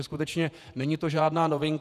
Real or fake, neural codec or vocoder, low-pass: fake; vocoder, 48 kHz, 128 mel bands, Vocos; 14.4 kHz